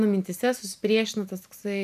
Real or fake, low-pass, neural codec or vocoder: fake; 14.4 kHz; vocoder, 44.1 kHz, 128 mel bands every 512 samples, BigVGAN v2